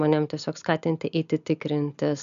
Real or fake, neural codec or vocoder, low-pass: real; none; 7.2 kHz